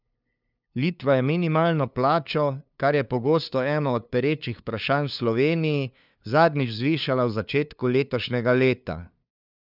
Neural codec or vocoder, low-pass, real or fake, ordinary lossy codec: codec, 16 kHz, 2 kbps, FunCodec, trained on LibriTTS, 25 frames a second; 5.4 kHz; fake; none